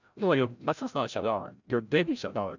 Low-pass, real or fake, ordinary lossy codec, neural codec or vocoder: 7.2 kHz; fake; none; codec, 16 kHz, 0.5 kbps, FreqCodec, larger model